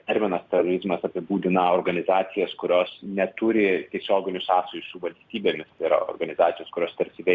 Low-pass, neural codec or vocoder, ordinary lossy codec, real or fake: 7.2 kHz; none; Opus, 64 kbps; real